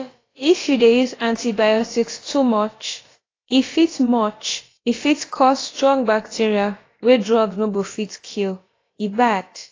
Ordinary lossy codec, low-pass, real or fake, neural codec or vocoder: AAC, 32 kbps; 7.2 kHz; fake; codec, 16 kHz, about 1 kbps, DyCAST, with the encoder's durations